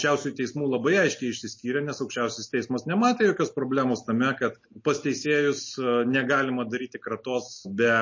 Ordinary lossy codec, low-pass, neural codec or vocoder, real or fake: MP3, 32 kbps; 7.2 kHz; none; real